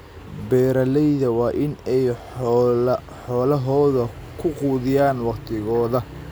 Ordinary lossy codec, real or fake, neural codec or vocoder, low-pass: none; real; none; none